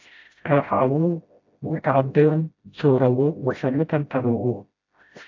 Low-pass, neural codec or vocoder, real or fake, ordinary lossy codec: 7.2 kHz; codec, 16 kHz, 0.5 kbps, FreqCodec, smaller model; fake; AAC, 48 kbps